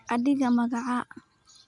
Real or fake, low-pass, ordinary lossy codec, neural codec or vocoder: real; 10.8 kHz; none; none